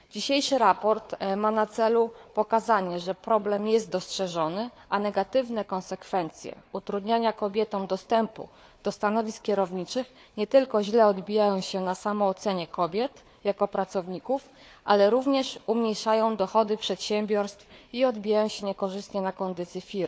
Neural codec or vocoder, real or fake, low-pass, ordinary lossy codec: codec, 16 kHz, 4 kbps, FunCodec, trained on Chinese and English, 50 frames a second; fake; none; none